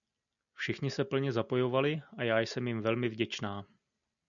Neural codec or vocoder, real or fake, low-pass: none; real; 7.2 kHz